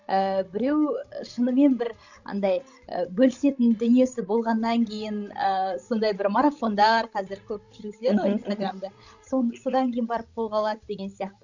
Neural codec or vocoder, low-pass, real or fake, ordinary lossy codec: codec, 16 kHz, 16 kbps, FreqCodec, larger model; 7.2 kHz; fake; none